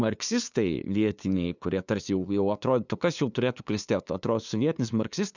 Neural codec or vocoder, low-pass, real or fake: codec, 16 kHz, 2 kbps, FunCodec, trained on LibriTTS, 25 frames a second; 7.2 kHz; fake